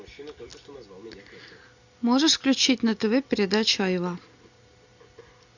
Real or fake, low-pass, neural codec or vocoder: real; 7.2 kHz; none